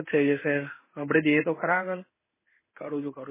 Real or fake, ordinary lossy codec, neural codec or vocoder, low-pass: fake; MP3, 16 kbps; codec, 16 kHz in and 24 kHz out, 1 kbps, XY-Tokenizer; 3.6 kHz